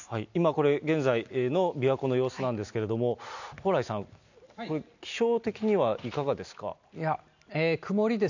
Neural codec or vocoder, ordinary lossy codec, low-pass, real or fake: none; none; 7.2 kHz; real